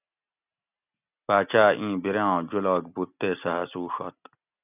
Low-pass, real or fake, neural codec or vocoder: 3.6 kHz; real; none